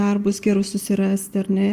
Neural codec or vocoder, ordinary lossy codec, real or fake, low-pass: none; Opus, 32 kbps; real; 14.4 kHz